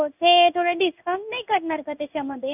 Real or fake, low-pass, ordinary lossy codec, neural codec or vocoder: fake; 3.6 kHz; none; codec, 16 kHz in and 24 kHz out, 1 kbps, XY-Tokenizer